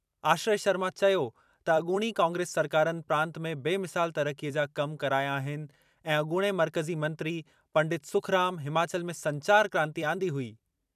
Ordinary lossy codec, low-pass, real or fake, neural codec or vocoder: none; 14.4 kHz; fake; vocoder, 44.1 kHz, 128 mel bands every 256 samples, BigVGAN v2